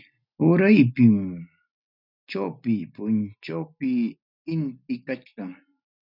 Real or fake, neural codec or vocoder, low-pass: real; none; 5.4 kHz